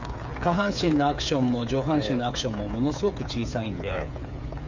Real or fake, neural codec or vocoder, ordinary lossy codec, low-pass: fake; codec, 16 kHz, 8 kbps, FreqCodec, smaller model; none; 7.2 kHz